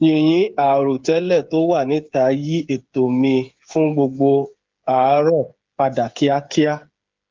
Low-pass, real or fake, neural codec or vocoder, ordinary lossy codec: 7.2 kHz; fake; codec, 16 kHz, 8 kbps, FreqCodec, smaller model; Opus, 32 kbps